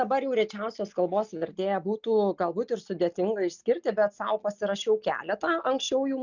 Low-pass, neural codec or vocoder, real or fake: 7.2 kHz; none; real